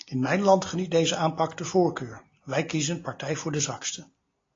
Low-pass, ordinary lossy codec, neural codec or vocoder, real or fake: 7.2 kHz; AAC, 32 kbps; none; real